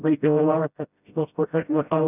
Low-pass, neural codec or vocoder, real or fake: 3.6 kHz; codec, 16 kHz, 0.5 kbps, FreqCodec, smaller model; fake